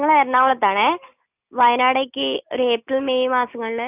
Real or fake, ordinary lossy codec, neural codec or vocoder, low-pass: real; none; none; 3.6 kHz